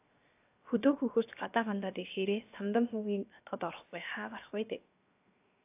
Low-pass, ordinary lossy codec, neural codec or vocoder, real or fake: 3.6 kHz; AAC, 32 kbps; codec, 16 kHz, 0.7 kbps, FocalCodec; fake